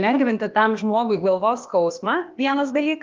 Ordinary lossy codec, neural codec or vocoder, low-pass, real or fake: Opus, 32 kbps; codec, 16 kHz, 0.8 kbps, ZipCodec; 7.2 kHz; fake